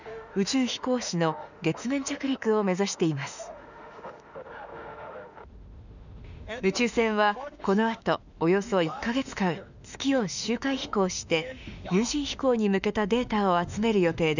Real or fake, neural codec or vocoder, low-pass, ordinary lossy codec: fake; autoencoder, 48 kHz, 32 numbers a frame, DAC-VAE, trained on Japanese speech; 7.2 kHz; none